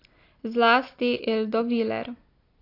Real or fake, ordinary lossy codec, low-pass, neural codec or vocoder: real; AAC, 32 kbps; 5.4 kHz; none